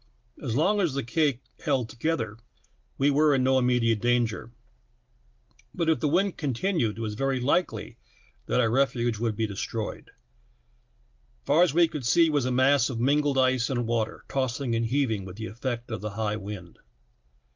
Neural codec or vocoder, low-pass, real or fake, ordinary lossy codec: none; 7.2 kHz; real; Opus, 24 kbps